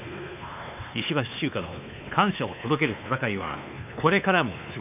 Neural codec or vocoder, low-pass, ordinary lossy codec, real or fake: codec, 16 kHz, 2 kbps, X-Codec, WavLM features, trained on Multilingual LibriSpeech; 3.6 kHz; none; fake